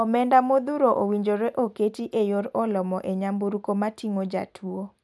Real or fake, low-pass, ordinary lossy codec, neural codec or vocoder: real; none; none; none